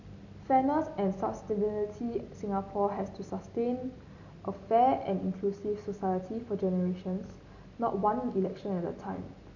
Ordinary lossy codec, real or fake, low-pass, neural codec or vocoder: none; real; 7.2 kHz; none